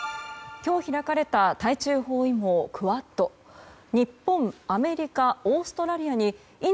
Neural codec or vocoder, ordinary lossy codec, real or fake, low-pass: none; none; real; none